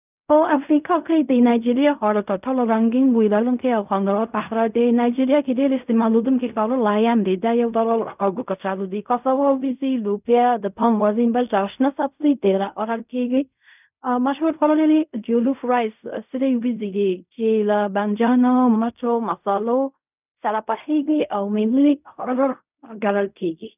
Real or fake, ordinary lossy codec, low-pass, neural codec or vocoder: fake; none; 3.6 kHz; codec, 16 kHz in and 24 kHz out, 0.4 kbps, LongCat-Audio-Codec, fine tuned four codebook decoder